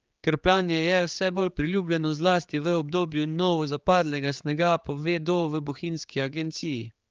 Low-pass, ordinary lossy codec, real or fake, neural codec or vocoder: 7.2 kHz; Opus, 24 kbps; fake; codec, 16 kHz, 4 kbps, X-Codec, HuBERT features, trained on general audio